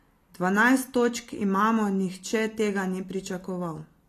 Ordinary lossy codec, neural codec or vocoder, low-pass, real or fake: AAC, 48 kbps; none; 14.4 kHz; real